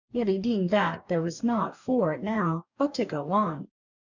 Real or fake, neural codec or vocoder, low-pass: fake; codec, 44.1 kHz, 2.6 kbps, DAC; 7.2 kHz